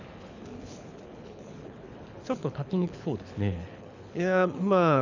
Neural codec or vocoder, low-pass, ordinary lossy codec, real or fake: codec, 24 kHz, 6 kbps, HILCodec; 7.2 kHz; none; fake